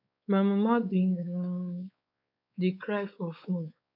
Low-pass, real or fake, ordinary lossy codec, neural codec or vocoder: 5.4 kHz; fake; none; codec, 16 kHz, 4 kbps, X-Codec, WavLM features, trained on Multilingual LibriSpeech